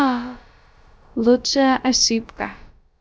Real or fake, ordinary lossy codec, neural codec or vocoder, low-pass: fake; none; codec, 16 kHz, about 1 kbps, DyCAST, with the encoder's durations; none